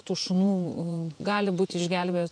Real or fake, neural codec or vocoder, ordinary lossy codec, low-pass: fake; vocoder, 48 kHz, 128 mel bands, Vocos; MP3, 64 kbps; 9.9 kHz